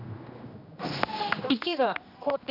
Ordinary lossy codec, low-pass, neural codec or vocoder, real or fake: none; 5.4 kHz; codec, 16 kHz, 1 kbps, X-Codec, HuBERT features, trained on general audio; fake